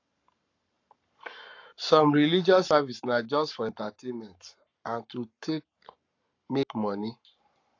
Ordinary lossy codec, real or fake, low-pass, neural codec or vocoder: AAC, 48 kbps; fake; 7.2 kHz; codec, 44.1 kHz, 7.8 kbps, Pupu-Codec